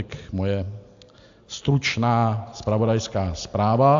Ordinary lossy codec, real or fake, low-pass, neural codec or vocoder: AAC, 64 kbps; real; 7.2 kHz; none